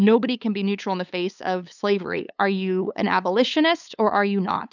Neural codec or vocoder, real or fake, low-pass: codec, 16 kHz, 8 kbps, FunCodec, trained on LibriTTS, 25 frames a second; fake; 7.2 kHz